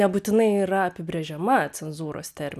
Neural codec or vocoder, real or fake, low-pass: none; real; 14.4 kHz